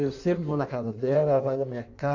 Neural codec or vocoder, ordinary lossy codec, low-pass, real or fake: codec, 16 kHz in and 24 kHz out, 1.1 kbps, FireRedTTS-2 codec; none; 7.2 kHz; fake